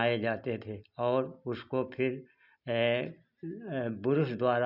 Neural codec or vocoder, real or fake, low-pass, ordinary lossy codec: none; real; 5.4 kHz; none